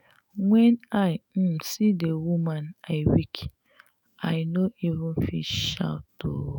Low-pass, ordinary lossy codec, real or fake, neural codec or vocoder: 19.8 kHz; none; fake; autoencoder, 48 kHz, 128 numbers a frame, DAC-VAE, trained on Japanese speech